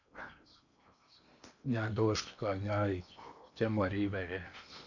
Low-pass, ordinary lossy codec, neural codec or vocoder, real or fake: 7.2 kHz; none; codec, 16 kHz in and 24 kHz out, 0.8 kbps, FocalCodec, streaming, 65536 codes; fake